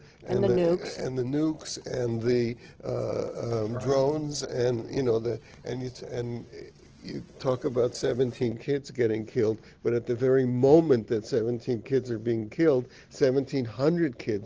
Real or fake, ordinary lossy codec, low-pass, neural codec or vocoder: real; Opus, 16 kbps; 7.2 kHz; none